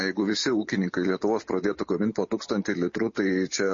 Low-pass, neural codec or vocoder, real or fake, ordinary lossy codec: 7.2 kHz; none; real; MP3, 32 kbps